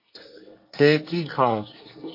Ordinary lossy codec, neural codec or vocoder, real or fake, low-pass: MP3, 32 kbps; codec, 24 kHz, 1 kbps, SNAC; fake; 5.4 kHz